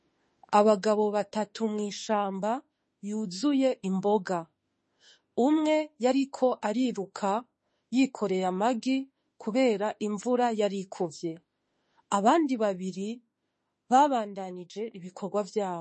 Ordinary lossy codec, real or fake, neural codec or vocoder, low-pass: MP3, 32 kbps; fake; autoencoder, 48 kHz, 32 numbers a frame, DAC-VAE, trained on Japanese speech; 10.8 kHz